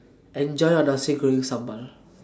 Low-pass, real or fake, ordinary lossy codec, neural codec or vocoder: none; real; none; none